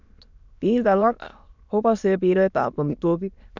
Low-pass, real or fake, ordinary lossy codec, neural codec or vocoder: 7.2 kHz; fake; none; autoencoder, 22.05 kHz, a latent of 192 numbers a frame, VITS, trained on many speakers